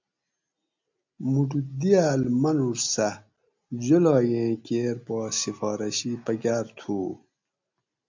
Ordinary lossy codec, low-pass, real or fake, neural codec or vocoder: MP3, 64 kbps; 7.2 kHz; real; none